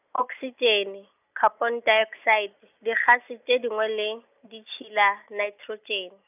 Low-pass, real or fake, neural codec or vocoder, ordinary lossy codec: 3.6 kHz; real; none; none